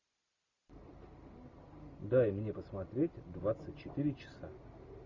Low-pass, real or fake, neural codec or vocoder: 7.2 kHz; real; none